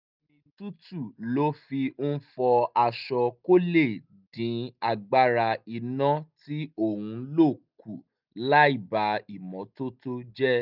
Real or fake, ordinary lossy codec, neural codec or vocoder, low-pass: real; none; none; 5.4 kHz